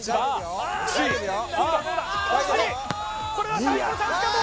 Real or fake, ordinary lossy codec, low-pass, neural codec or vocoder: real; none; none; none